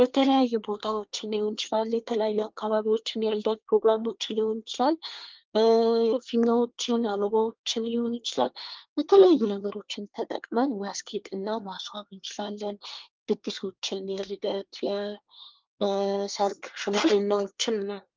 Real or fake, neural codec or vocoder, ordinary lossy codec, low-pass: fake; codec, 24 kHz, 1 kbps, SNAC; Opus, 32 kbps; 7.2 kHz